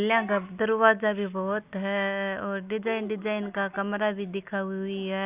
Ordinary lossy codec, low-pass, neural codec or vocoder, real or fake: Opus, 64 kbps; 3.6 kHz; none; real